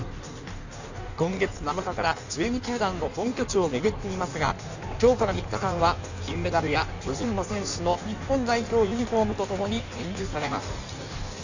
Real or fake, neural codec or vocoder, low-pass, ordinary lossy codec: fake; codec, 16 kHz in and 24 kHz out, 1.1 kbps, FireRedTTS-2 codec; 7.2 kHz; none